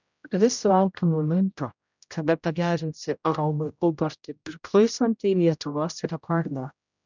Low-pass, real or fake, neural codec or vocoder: 7.2 kHz; fake; codec, 16 kHz, 0.5 kbps, X-Codec, HuBERT features, trained on general audio